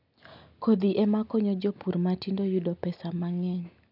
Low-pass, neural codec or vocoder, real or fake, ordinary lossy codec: 5.4 kHz; none; real; none